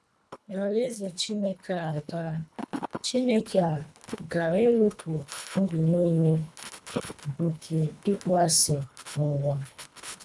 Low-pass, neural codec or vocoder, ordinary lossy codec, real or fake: none; codec, 24 kHz, 1.5 kbps, HILCodec; none; fake